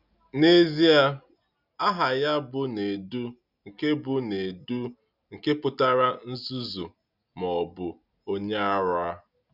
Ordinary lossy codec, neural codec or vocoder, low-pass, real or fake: none; none; 5.4 kHz; real